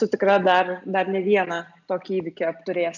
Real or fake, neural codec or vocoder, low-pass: real; none; 7.2 kHz